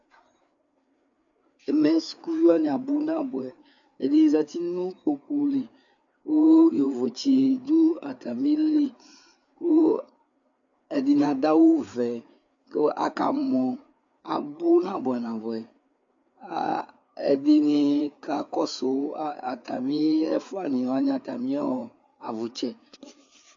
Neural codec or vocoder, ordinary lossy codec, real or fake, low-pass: codec, 16 kHz, 4 kbps, FreqCodec, larger model; MP3, 64 kbps; fake; 7.2 kHz